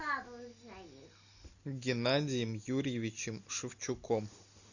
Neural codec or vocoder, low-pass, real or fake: none; 7.2 kHz; real